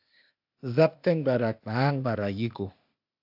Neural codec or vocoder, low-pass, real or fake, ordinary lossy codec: codec, 16 kHz, 0.8 kbps, ZipCodec; 5.4 kHz; fake; AAC, 48 kbps